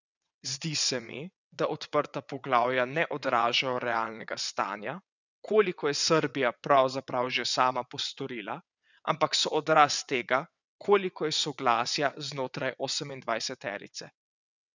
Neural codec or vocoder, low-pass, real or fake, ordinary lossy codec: vocoder, 22.05 kHz, 80 mel bands, WaveNeXt; 7.2 kHz; fake; none